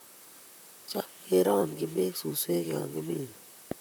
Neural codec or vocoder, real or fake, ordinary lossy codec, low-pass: vocoder, 44.1 kHz, 128 mel bands, Pupu-Vocoder; fake; none; none